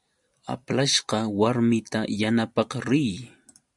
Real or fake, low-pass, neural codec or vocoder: real; 10.8 kHz; none